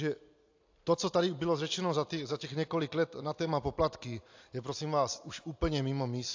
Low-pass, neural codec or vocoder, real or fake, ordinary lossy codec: 7.2 kHz; none; real; AAC, 48 kbps